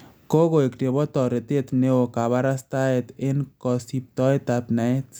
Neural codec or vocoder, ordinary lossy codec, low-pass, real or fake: none; none; none; real